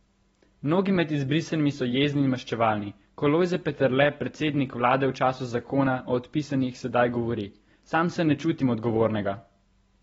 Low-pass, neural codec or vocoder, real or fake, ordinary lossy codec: 19.8 kHz; none; real; AAC, 24 kbps